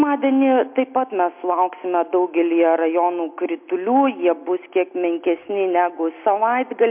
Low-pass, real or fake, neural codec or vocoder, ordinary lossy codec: 3.6 kHz; real; none; MP3, 32 kbps